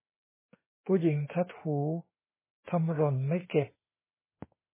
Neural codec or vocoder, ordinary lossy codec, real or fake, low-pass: autoencoder, 48 kHz, 32 numbers a frame, DAC-VAE, trained on Japanese speech; MP3, 16 kbps; fake; 3.6 kHz